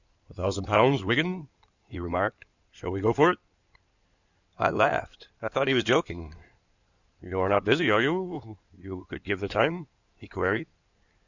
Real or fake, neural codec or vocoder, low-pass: fake; codec, 16 kHz in and 24 kHz out, 2.2 kbps, FireRedTTS-2 codec; 7.2 kHz